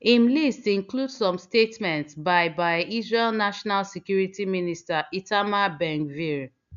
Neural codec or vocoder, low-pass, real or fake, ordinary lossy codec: none; 7.2 kHz; real; none